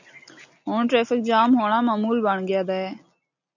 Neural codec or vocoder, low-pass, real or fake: none; 7.2 kHz; real